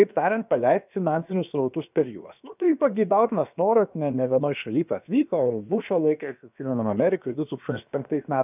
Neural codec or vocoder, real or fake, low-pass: codec, 16 kHz, about 1 kbps, DyCAST, with the encoder's durations; fake; 3.6 kHz